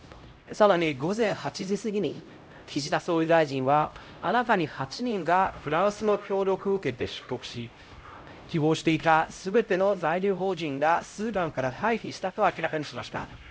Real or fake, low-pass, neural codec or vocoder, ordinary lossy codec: fake; none; codec, 16 kHz, 0.5 kbps, X-Codec, HuBERT features, trained on LibriSpeech; none